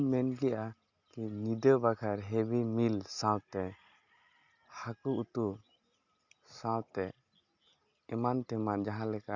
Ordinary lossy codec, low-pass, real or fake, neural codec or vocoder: Opus, 64 kbps; 7.2 kHz; real; none